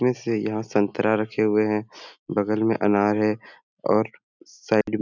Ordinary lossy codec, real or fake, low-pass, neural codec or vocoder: none; real; 7.2 kHz; none